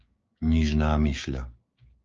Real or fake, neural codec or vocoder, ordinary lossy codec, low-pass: fake; codec, 16 kHz, 6 kbps, DAC; Opus, 24 kbps; 7.2 kHz